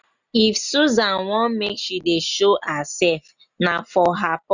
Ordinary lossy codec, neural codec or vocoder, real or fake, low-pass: none; none; real; 7.2 kHz